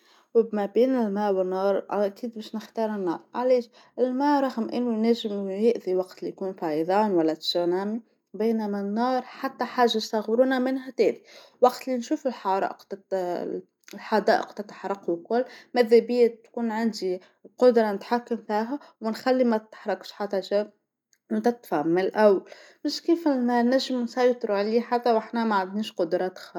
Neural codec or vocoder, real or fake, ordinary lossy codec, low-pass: none; real; none; 19.8 kHz